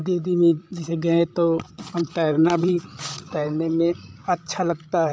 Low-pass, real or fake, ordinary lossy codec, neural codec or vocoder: none; fake; none; codec, 16 kHz, 16 kbps, FreqCodec, larger model